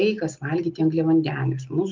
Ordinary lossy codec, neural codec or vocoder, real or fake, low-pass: Opus, 24 kbps; none; real; 7.2 kHz